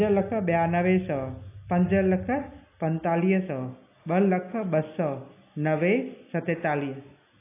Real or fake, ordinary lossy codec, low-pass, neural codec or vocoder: real; AAC, 24 kbps; 3.6 kHz; none